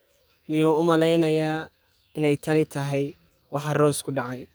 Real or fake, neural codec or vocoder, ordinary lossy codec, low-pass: fake; codec, 44.1 kHz, 2.6 kbps, SNAC; none; none